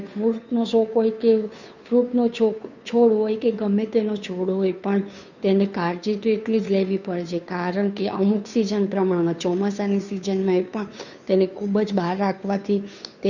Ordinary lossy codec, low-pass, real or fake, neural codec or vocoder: none; 7.2 kHz; fake; codec, 16 kHz, 2 kbps, FunCodec, trained on Chinese and English, 25 frames a second